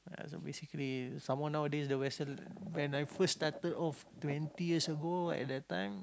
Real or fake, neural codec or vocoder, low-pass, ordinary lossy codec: real; none; none; none